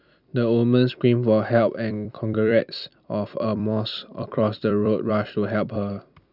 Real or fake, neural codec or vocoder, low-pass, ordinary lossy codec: fake; vocoder, 44.1 kHz, 128 mel bands every 256 samples, BigVGAN v2; 5.4 kHz; none